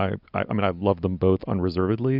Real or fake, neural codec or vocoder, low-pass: real; none; 5.4 kHz